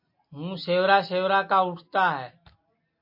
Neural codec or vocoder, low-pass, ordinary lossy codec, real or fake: none; 5.4 kHz; MP3, 32 kbps; real